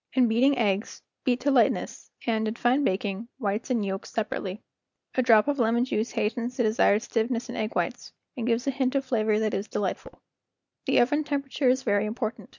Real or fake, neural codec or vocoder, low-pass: real; none; 7.2 kHz